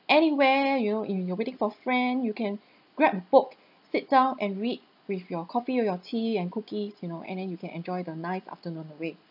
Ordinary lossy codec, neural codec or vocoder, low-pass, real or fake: none; none; 5.4 kHz; real